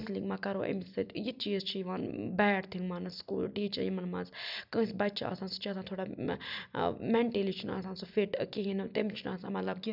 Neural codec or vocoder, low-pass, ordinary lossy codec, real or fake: none; 5.4 kHz; none; real